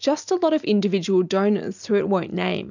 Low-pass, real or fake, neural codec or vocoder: 7.2 kHz; real; none